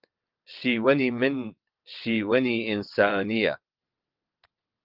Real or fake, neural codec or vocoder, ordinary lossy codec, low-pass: fake; vocoder, 22.05 kHz, 80 mel bands, WaveNeXt; Opus, 32 kbps; 5.4 kHz